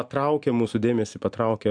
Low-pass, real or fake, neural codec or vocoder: 9.9 kHz; real; none